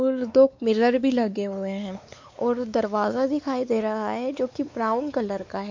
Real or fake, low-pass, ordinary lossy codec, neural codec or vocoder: fake; 7.2 kHz; MP3, 48 kbps; codec, 16 kHz, 4 kbps, X-Codec, WavLM features, trained on Multilingual LibriSpeech